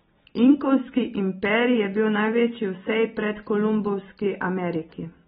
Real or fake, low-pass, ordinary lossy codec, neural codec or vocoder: real; 19.8 kHz; AAC, 16 kbps; none